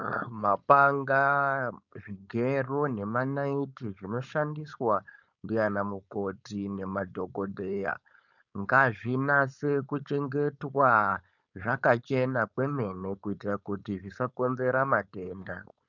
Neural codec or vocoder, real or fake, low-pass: codec, 16 kHz, 4.8 kbps, FACodec; fake; 7.2 kHz